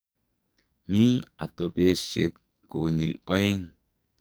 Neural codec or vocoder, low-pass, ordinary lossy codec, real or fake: codec, 44.1 kHz, 2.6 kbps, SNAC; none; none; fake